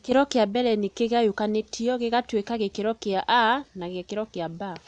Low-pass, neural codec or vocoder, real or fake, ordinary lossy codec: 9.9 kHz; none; real; none